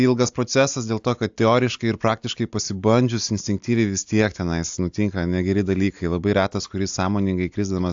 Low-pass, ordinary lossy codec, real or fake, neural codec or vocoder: 7.2 kHz; AAC, 64 kbps; real; none